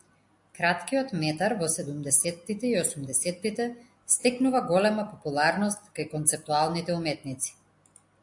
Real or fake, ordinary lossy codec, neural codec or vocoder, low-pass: real; AAC, 64 kbps; none; 10.8 kHz